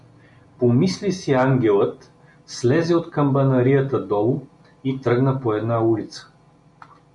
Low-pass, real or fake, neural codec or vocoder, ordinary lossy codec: 10.8 kHz; real; none; MP3, 96 kbps